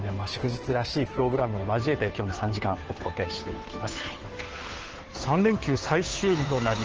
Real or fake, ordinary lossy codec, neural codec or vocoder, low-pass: fake; Opus, 16 kbps; codec, 16 kHz, 2 kbps, FunCodec, trained on Chinese and English, 25 frames a second; 7.2 kHz